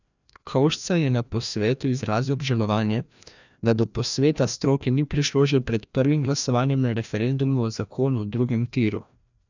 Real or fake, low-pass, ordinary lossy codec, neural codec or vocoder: fake; 7.2 kHz; none; codec, 16 kHz, 1 kbps, FreqCodec, larger model